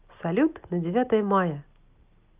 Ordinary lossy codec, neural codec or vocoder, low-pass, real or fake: Opus, 24 kbps; none; 3.6 kHz; real